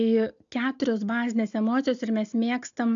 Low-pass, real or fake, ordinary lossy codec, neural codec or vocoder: 7.2 kHz; real; AAC, 64 kbps; none